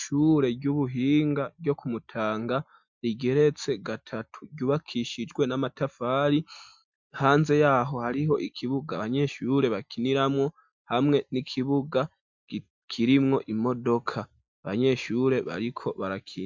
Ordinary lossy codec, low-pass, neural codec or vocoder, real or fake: MP3, 64 kbps; 7.2 kHz; none; real